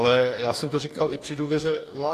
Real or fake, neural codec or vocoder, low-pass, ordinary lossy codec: fake; codec, 44.1 kHz, 2.6 kbps, DAC; 14.4 kHz; AAC, 64 kbps